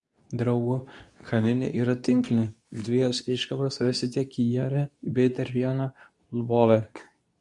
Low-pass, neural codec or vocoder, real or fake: 10.8 kHz; codec, 24 kHz, 0.9 kbps, WavTokenizer, medium speech release version 2; fake